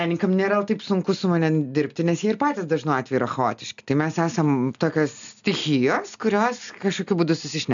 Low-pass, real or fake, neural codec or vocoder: 7.2 kHz; real; none